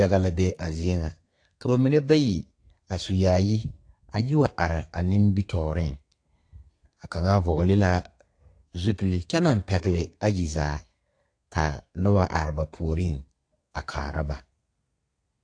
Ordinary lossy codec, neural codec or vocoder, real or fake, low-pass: AAC, 48 kbps; codec, 32 kHz, 1.9 kbps, SNAC; fake; 9.9 kHz